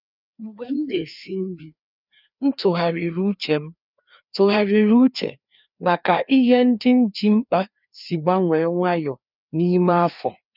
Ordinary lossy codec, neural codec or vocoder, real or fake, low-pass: none; codec, 16 kHz, 2 kbps, FreqCodec, larger model; fake; 5.4 kHz